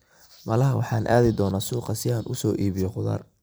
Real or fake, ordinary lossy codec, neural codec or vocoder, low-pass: fake; none; vocoder, 44.1 kHz, 128 mel bands every 256 samples, BigVGAN v2; none